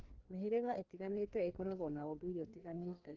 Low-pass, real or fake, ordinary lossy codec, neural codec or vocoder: 7.2 kHz; fake; Opus, 16 kbps; codec, 16 kHz, 2 kbps, FreqCodec, larger model